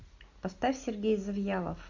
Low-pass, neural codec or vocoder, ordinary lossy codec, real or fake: 7.2 kHz; none; MP3, 64 kbps; real